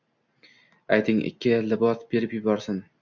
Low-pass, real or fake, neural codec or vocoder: 7.2 kHz; real; none